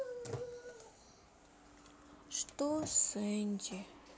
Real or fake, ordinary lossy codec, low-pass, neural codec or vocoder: real; none; none; none